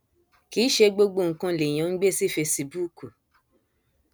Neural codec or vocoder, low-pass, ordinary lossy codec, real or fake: none; none; none; real